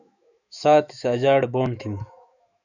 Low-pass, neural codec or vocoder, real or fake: 7.2 kHz; autoencoder, 48 kHz, 128 numbers a frame, DAC-VAE, trained on Japanese speech; fake